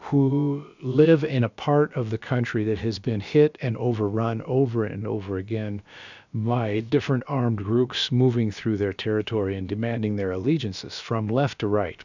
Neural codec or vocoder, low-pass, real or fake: codec, 16 kHz, about 1 kbps, DyCAST, with the encoder's durations; 7.2 kHz; fake